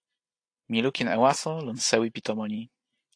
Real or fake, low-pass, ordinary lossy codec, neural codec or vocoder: real; 9.9 kHz; AAC, 48 kbps; none